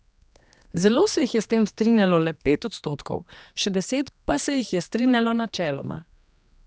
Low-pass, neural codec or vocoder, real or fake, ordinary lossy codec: none; codec, 16 kHz, 2 kbps, X-Codec, HuBERT features, trained on general audio; fake; none